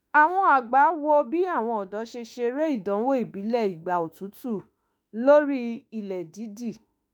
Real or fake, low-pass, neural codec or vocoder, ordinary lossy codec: fake; none; autoencoder, 48 kHz, 32 numbers a frame, DAC-VAE, trained on Japanese speech; none